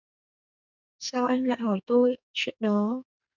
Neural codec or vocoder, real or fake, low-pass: codec, 44.1 kHz, 2.6 kbps, SNAC; fake; 7.2 kHz